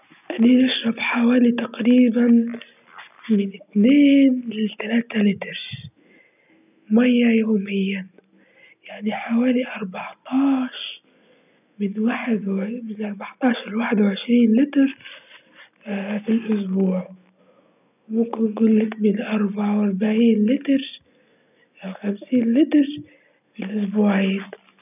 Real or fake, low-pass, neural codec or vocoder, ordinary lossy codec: real; 3.6 kHz; none; none